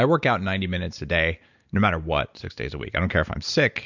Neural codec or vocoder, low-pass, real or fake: none; 7.2 kHz; real